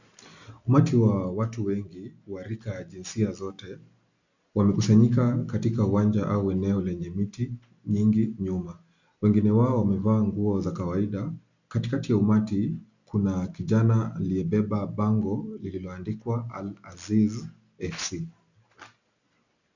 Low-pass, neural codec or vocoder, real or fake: 7.2 kHz; none; real